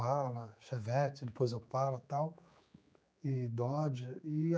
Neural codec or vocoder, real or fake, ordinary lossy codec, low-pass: codec, 16 kHz, 4 kbps, X-Codec, HuBERT features, trained on general audio; fake; none; none